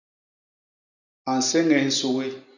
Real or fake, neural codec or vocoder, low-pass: real; none; 7.2 kHz